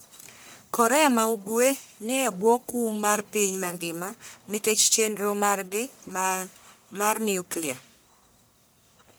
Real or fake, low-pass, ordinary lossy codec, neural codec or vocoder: fake; none; none; codec, 44.1 kHz, 1.7 kbps, Pupu-Codec